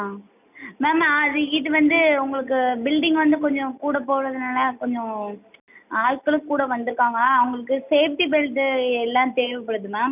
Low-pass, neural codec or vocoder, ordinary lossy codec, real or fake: 3.6 kHz; none; none; real